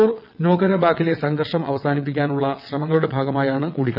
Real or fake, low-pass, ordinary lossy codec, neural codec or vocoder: fake; 5.4 kHz; none; vocoder, 22.05 kHz, 80 mel bands, WaveNeXt